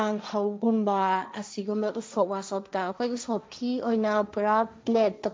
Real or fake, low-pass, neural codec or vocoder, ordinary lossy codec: fake; none; codec, 16 kHz, 1.1 kbps, Voila-Tokenizer; none